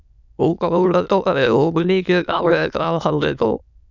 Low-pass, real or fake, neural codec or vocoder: 7.2 kHz; fake; autoencoder, 22.05 kHz, a latent of 192 numbers a frame, VITS, trained on many speakers